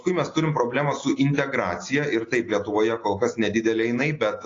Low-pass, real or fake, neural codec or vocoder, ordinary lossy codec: 7.2 kHz; real; none; AAC, 32 kbps